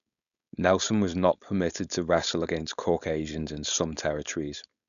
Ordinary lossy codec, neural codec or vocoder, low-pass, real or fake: none; codec, 16 kHz, 4.8 kbps, FACodec; 7.2 kHz; fake